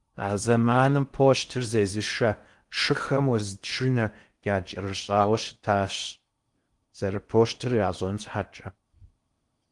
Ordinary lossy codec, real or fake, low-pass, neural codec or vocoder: Opus, 24 kbps; fake; 10.8 kHz; codec, 16 kHz in and 24 kHz out, 0.6 kbps, FocalCodec, streaming, 4096 codes